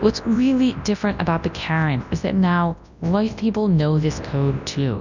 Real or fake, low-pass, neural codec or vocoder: fake; 7.2 kHz; codec, 24 kHz, 0.9 kbps, WavTokenizer, large speech release